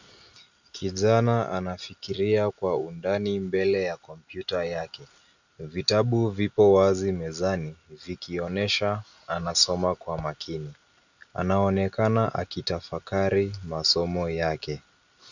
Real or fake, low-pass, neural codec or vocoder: real; 7.2 kHz; none